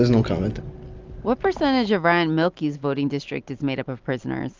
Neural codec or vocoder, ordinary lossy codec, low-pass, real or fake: none; Opus, 24 kbps; 7.2 kHz; real